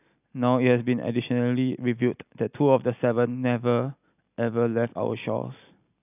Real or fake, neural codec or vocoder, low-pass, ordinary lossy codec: real; none; 3.6 kHz; none